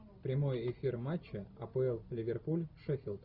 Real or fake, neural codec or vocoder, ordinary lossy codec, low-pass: real; none; Opus, 64 kbps; 5.4 kHz